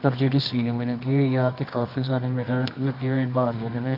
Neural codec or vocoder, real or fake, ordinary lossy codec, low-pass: codec, 24 kHz, 0.9 kbps, WavTokenizer, medium music audio release; fake; none; 5.4 kHz